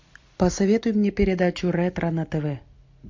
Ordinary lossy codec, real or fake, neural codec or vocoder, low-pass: MP3, 48 kbps; real; none; 7.2 kHz